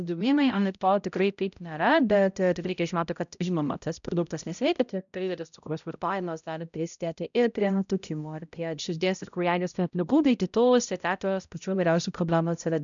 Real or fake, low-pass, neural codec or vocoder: fake; 7.2 kHz; codec, 16 kHz, 0.5 kbps, X-Codec, HuBERT features, trained on balanced general audio